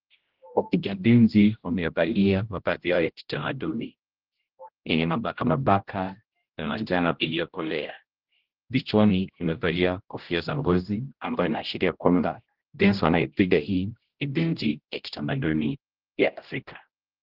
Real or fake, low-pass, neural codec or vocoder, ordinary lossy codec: fake; 5.4 kHz; codec, 16 kHz, 0.5 kbps, X-Codec, HuBERT features, trained on general audio; Opus, 16 kbps